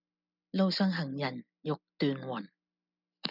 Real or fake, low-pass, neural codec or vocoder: real; 5.4 kHz; none